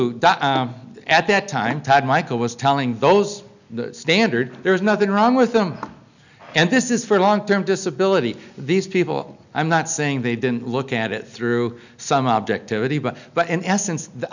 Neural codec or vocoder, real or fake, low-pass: none; real; 7.2 kHz